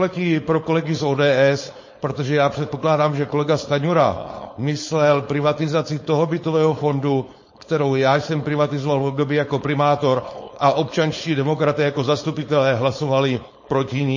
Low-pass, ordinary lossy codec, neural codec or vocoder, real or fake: 7.2 kHz; MP3, 32 kbps; codec, 16 kHz, 4.8 kbps, FACodec; fake